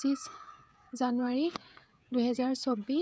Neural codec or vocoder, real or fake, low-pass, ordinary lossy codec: codec, 16 kHz, 8 kbps, FreqCodec, smaller model; fake; none; none